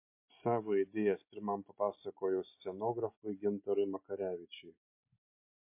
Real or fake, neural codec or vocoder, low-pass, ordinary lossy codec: real; none; 3.6 kHz; MP3, 24 kbps